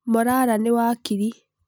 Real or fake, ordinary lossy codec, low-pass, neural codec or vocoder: real; none; none; none